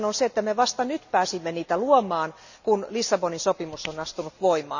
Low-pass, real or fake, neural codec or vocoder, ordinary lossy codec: 7.2 kHz; real; none; none